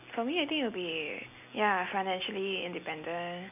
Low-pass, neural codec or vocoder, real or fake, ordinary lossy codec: 3.6 kHz; none; real; none